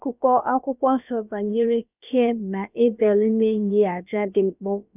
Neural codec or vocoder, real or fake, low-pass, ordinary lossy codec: codec, 16 kHz, about 1 kbps, DyCAST, with the encoder's durations; fake; 3.6 kHz; none